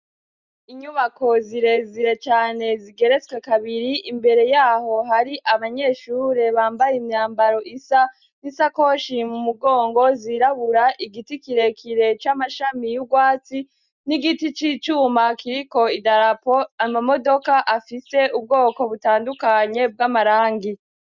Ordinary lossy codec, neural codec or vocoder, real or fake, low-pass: Opus, 64 kbps; none; real; 7.2 kHz